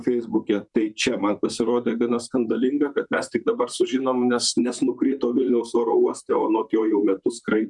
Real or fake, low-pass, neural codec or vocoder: fake; 10.8 kHz; vocoder, 44.1 kHz, 128 mel bands, Pupu-Vocoder